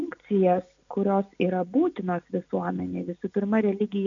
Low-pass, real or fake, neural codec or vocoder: 7.2 kHz; real; none